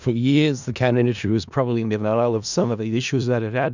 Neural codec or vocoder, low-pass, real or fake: codec, 16 kHz in and 24 kHz out, 0.4 kbps, LongCat-Audio-Codec, four codebook decoder; 7.2 kHz; fake